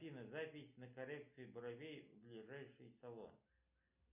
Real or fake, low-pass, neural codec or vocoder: real; 3.6 kHz; none